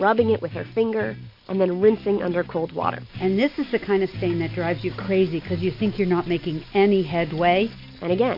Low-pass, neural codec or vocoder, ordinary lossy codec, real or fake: 5.4 kHz; none; MP3, 32 kbps; real